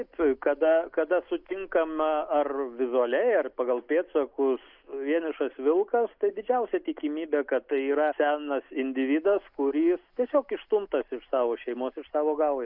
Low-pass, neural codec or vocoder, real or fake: 5.4 kHz; none; real